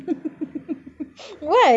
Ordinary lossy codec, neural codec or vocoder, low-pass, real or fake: none; none; none; real